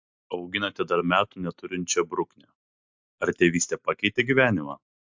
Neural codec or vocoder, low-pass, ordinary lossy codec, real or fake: none; 7.2 kHz; MP3, 64 kbps; real